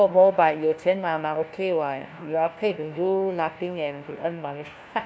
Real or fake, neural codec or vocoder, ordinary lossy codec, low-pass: fake; codec, 16 kHz, 1 kbps, FunCodec, trained on LibriTTS, 50 frames a second; none; none